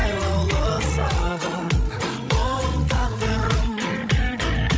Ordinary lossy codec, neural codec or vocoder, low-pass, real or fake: none; codec, 16 kHz, 16 kbps, FreqCodec, larger model; none; fake